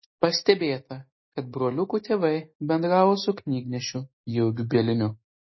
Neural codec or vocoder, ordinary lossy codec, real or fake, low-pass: none; MP3, 24 kbps; real; 7.2 kHz